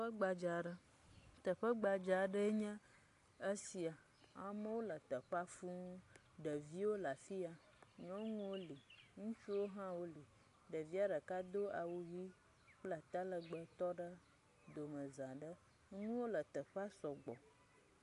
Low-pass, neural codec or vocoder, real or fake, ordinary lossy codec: 10.8 kHz; none; real; AAC, 48 kbps